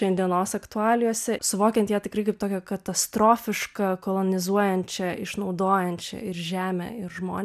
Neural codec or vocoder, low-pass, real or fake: none; 14.4 kHz; real